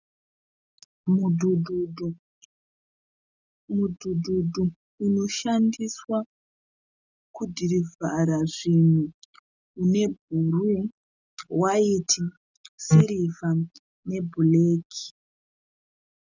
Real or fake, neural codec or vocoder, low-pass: real; none; 7.2 kHz